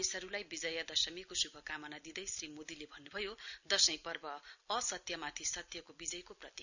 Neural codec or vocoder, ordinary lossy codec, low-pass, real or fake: none; none; 7.2 kHz; real